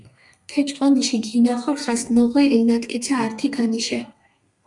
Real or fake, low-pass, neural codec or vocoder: fake; 10.8 kHz; codec, 32 kHz, 1.9 kbps, SNAC